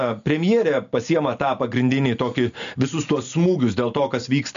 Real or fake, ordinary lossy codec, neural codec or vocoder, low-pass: real; MP3, 64 kbps; none; 7.2 kHz